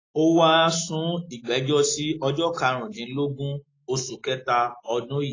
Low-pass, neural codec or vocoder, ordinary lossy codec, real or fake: 7.2 kHz; none; AAC, 32 kbps; real